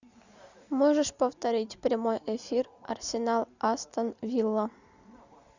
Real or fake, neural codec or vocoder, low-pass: real; none; 7.2 kHz